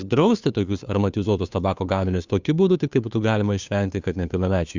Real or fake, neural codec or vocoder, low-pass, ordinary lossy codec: fake; codec, 16 kHz, 2 kbps, FunCodec, trained on Chinese and English, 25 frames a second; 7.2 kHz; Opus, 64 kbps